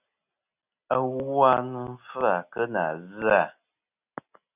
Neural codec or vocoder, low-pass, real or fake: none; 3.6 kHz; real